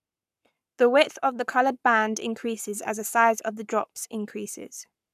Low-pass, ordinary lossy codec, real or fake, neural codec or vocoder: 14.4 kHz; none; fake; codec, 44.1 kHz, 7.8 kbps, Pupu-Codec